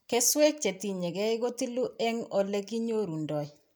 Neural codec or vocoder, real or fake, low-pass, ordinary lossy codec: none; real; none; none